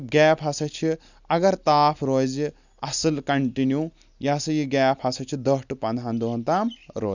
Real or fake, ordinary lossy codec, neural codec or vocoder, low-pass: real; none; none; 7.2 kHz